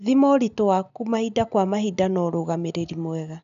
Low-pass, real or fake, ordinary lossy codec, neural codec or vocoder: 7.2 kHz; real; none; none